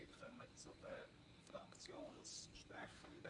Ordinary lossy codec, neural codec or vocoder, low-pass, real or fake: none; codec, 24 kHz, 0.9 kbps, WavTokenizer, medium speech release version 1; 10.8 kHz; fake